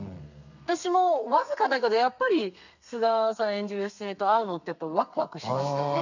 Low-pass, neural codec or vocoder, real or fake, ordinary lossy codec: 7.2 kHz; codec, 32 kHz, 1.9 kbps, SNAC; fake; none